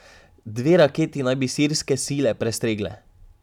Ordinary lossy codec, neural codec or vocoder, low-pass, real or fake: none; none; 19.8 kHz; real